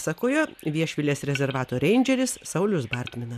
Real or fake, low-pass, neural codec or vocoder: real; 14.4 kHz; none